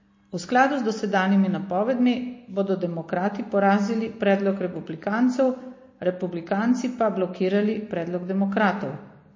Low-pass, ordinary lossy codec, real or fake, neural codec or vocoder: 7.2 kHz; MP3, 32 kbps; real; none